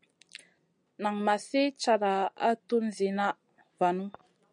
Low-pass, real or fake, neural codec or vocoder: 9.9 kHz; real; none